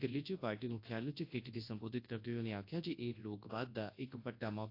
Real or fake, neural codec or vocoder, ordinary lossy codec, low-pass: fake; codec, 24 kHz, 0.9 kbps, WavTokenizer, large speech release; AAC, 32 kbps; 5.4 kHz